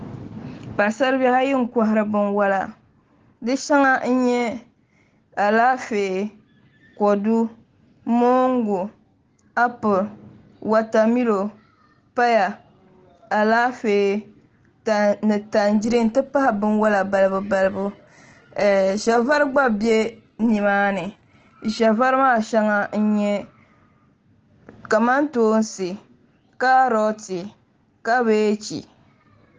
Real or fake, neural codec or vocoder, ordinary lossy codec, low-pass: real; none; Opus, 16 kbps; 7.2 kHz